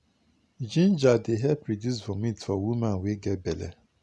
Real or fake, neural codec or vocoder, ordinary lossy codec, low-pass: real; none; none; none